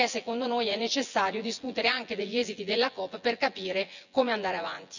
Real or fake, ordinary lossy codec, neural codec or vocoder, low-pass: fake; none; vocoder, 24 kHz, 100 mel bands, Vocos; 7.2 kHz